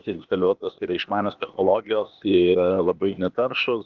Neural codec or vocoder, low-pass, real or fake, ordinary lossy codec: codec, 16 kHz, 0.8 kbps, ZipCodec; 7.2 kHz; fake; Opus, 32 kbps